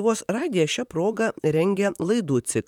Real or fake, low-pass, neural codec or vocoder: fake; 19.8 kHz; vocoder, 44.1 kHz, 128 mel bands, Pupu-Vocoder